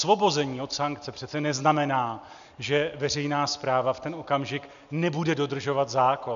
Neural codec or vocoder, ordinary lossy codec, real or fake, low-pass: none; MP3, 96 kbps; real; 7.2 kHz